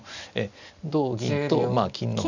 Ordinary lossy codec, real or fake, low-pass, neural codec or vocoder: none; real; 7.2 kHz; none